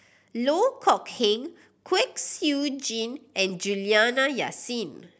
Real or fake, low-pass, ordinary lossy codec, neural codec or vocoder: real; none; none; none